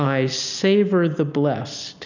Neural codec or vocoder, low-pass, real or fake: none; 7.2 kHz; real